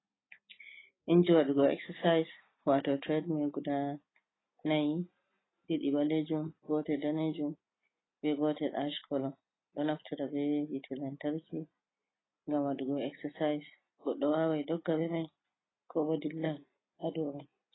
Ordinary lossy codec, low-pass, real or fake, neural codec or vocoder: AAC, 16 kbps; 7.2 kHz; real; none